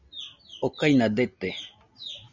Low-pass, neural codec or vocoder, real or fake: 7.2 kHz; none; real